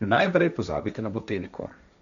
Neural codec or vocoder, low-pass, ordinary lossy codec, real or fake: codec, 16 kHz, 1.1 kbps, Voila-Tokenizer; 7.2 kHz; none; fake